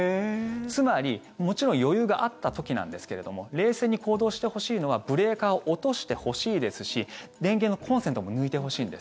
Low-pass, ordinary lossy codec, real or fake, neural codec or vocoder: none; none; real; none